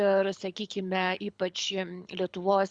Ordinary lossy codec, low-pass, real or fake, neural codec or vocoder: Opus, 64 kbps; 9.9 kHz; fake; codec, 24 kHz, 6 kbps, HILCodec